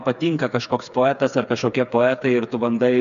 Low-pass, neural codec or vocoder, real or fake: 7.2 kHz; codec, 16 kHz, 4 kbps, FreqCodec, smaller model; fake